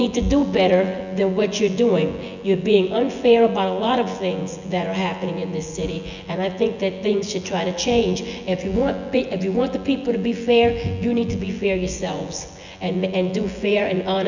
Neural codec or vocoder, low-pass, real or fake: vocoder, 24 kHz, 100 mel bands, Vocos; 7.2 kHz; fake